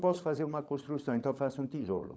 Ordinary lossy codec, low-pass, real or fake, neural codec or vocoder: none; none; fake; codec, 16 kHz, 16 kbps, FunCodec, trained on LibriTTS, 50 frames a second